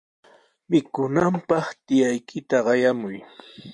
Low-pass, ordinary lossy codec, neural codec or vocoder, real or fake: 10.8 kHz; MP3, 64 kbps; none; real